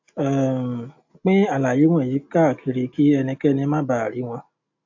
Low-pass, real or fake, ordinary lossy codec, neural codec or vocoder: 7.2 kHz; real; AAC, 48 kbps; none